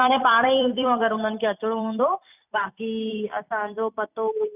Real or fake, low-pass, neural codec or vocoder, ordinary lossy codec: fake; 3.6 kHz; vocoder, 44.1 kHz, 128 mel bands every 256 samples, BigVGAN v2; none